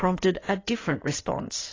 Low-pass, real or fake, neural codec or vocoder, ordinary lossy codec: 7.2 kHz; fake; codec, 16 kHz in and 24 kHz out, 2.2 kbps, FireRedTTS-2 codec; AAC, 32 kbps